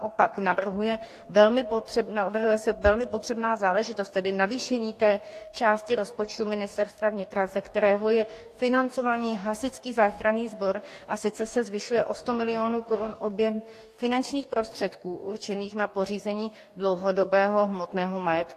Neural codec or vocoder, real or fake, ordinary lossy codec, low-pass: codec, 44.1 kHz, 2.6 kbps, DAC; fake; AAC, 64 kbps; 14.4 kHz